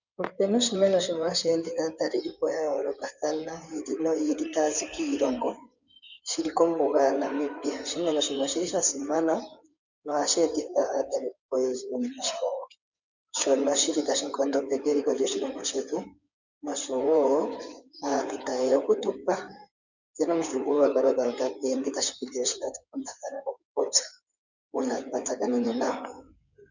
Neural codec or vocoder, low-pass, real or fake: codec, 16 kHz in and 24 kHz out, 2.2 kbps, FireRedTTS-2 codec; 7.2 kHz; fake